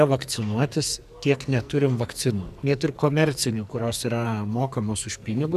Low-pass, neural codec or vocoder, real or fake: 14.4 kHz; codec, 44.1 kHz, 2.6 kbps, SNAC; fake